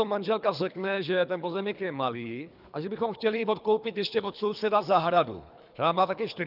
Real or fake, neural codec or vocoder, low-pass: fake; codec, 24 kHz, 3 kbps, HILCodec; 5.4 kHz